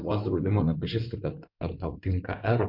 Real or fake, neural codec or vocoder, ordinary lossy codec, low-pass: fake; codec, 16 kHz in and 24 kHz out, 2.2 kbps, FireRedTTS-2 codec; MP3, 48 kbps; 5.4 kHz